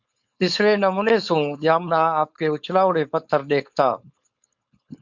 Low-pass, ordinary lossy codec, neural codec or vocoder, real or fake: 7.2 kHz; Opus, 64 kbps; codec, 16 kHz, 4.8 kbps, FACodec; fake